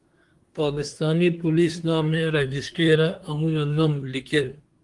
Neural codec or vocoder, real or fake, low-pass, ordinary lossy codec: codec, 24 kHz, 1 kbps, SNAC; fake; 10.8 kHz; Opus, 24 kbps